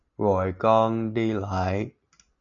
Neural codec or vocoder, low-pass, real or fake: none; 7.2 kHz; real